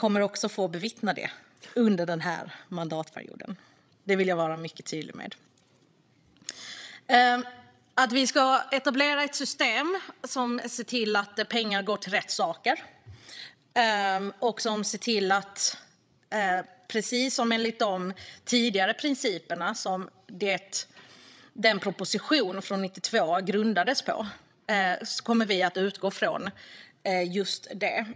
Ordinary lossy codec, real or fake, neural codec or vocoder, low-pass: none; fake; codec, 16 kHz, 16 kbps, FreqCodec, larger model; none